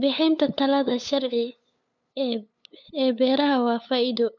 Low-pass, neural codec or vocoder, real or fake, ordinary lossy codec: 7.2 kHz; codec, 16 kHz, 8 kbps, FunCodec, trained on LibriTTS, 25 frames a second; fake; none